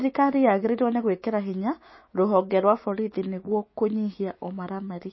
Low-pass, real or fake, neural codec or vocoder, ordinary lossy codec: 7.2 kHz; fake; autoencoder, 48 kHz, 128 numbers a frame, DAC-VAE, trained on Japanese speech; MP3, 24 kbps